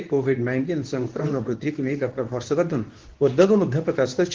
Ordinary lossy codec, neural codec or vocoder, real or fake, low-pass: Opus, 16 kbps; codec, 24 kHz, 0.9 kbps, WavTokenizer, medium speech release version 2; fake; 7.2 kHz